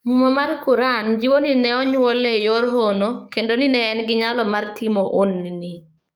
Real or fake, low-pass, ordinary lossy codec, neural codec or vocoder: fake; none; none; codec, 44.1 kHz, 7.8 kbps, DAC